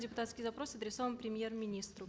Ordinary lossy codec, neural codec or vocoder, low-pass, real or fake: none; none; none; real